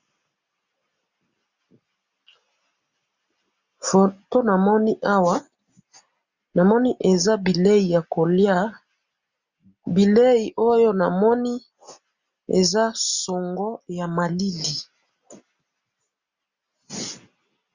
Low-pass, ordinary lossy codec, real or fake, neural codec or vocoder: 7.2 kHz; Opus, 64 kbps; real; none